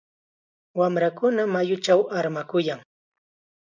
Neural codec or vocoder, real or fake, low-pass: none; real; 7.2 kHz